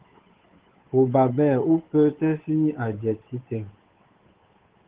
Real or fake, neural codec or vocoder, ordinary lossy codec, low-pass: fake; codec, 16 kHz, 8 kbps, FunCodec, trained on Chinese and English, 25 frames a second; Opus, 16 kbps; 3.6 kHz